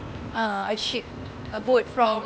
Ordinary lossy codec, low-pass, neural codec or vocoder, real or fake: none; none; codec, 16 kHz, 0.8 kbps, ZipCodec; fake